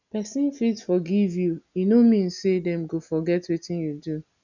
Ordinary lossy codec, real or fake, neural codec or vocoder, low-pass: none; real; none; 7.2 kHz